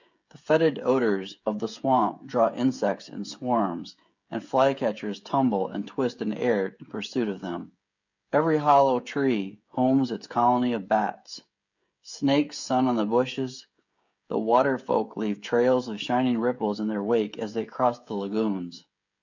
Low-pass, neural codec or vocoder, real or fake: 7.2 kHz; codec, 16 kHz, 16 kbps, FreqCodec, smaller model; fake